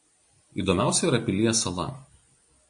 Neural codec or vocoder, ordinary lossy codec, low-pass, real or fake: none; MP3, 48 kbps; 9.9 kHz; real